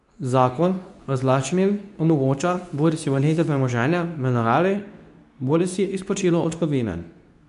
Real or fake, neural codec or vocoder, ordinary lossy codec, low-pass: fake; codec, 24 kHz, 0.9 kbps, WavTokenizer, medium speech release version 2; none; 10.8 kHz